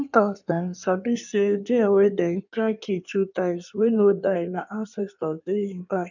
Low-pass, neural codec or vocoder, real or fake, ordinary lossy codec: 7.2 kHz; codec, 16 kHz in and 24 kHz out, 1.1 kbps, FireRedTTS-2 codec; fake; none